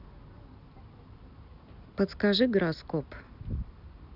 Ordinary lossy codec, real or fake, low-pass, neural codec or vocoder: none; real; 5.4 kHz; none